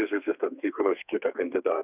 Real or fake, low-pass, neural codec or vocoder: fake; 3.6 kHz; codec, 32 kHz, 1.9 kbps, SNAC